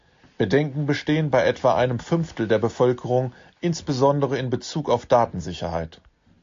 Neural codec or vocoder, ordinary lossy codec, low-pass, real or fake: none; AAC, 48 kbps; 7.2 kHz; real